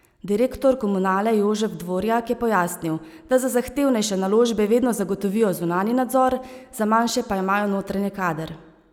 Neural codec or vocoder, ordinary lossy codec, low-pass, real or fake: none; none; 19.8 kHz; real